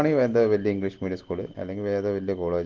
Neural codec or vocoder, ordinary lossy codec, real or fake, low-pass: none; Opus, 16 kbps; real; 7.2 kHz